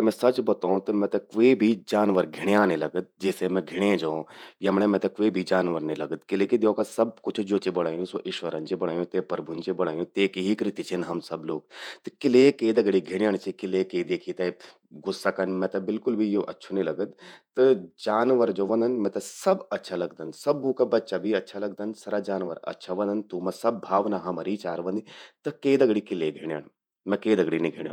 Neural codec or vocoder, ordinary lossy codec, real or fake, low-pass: vocoder, 48 kHz, 128 mel bands, Vocos; none; fake; 19.8 kHz